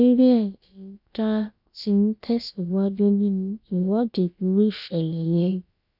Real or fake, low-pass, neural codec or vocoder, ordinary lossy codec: fake; 5.4 kHz; codec, 16 kHz, about 1 kbps, DyCAST, with the encoder's durations; none